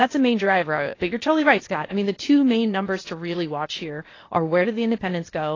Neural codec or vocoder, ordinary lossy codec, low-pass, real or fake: codec, 16 kHz, 0.8 kbps, ZipCodec; AAC, 32 kbps; 7.2 kHz; fake